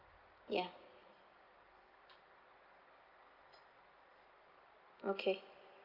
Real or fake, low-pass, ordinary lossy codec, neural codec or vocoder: real; 5.4 kHz; Opus, 24 kbps; none